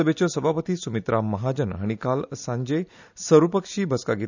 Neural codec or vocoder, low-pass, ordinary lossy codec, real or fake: none; 7.2 kHz; none; real